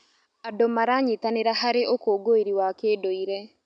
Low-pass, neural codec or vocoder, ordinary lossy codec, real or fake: 9.9 kHz; none; none; real